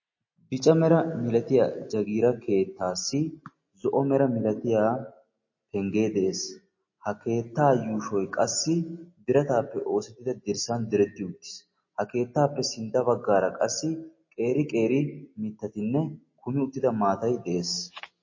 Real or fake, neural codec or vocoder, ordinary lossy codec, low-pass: real; none; MP3, 32 kbps; 7.2 kHz